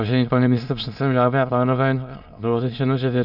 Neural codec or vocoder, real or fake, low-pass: autoencoder, 22.05 kHz, a latent of 192 numbers a frame, VITS, trained on many speakers; fake; 5.4 kHz